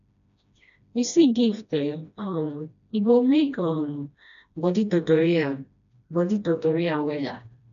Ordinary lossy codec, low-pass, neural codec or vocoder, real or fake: none; 7.2 kHz; codec, 16 kHz, 1 kbps, FreqCodec, smaller model; fake